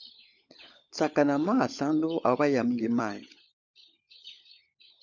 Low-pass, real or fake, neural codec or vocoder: 7.2 kHz; fake; codec, 16 kHz, 16 kbps, FunCodec, trained on LibriTTS, 50 frames a second